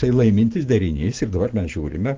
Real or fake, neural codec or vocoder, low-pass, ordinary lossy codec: real; none; 7.2 kHz; Opus, 16 kbps